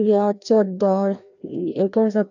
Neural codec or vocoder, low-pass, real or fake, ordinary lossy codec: codec, 16 kHz, 1 kbps, FreqCodec, larger model; 7.2 kHz; fake; none